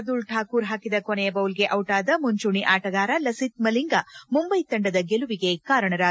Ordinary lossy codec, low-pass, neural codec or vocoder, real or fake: none; none; none; real